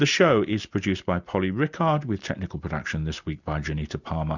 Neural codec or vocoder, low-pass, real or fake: none; 7.2 kHz; real